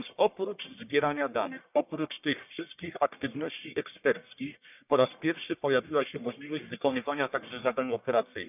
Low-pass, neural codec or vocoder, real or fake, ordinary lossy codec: 3.6 kHz; codec, 44.1 kHz, 1.7 kbps, Pupu-Codec; fake; none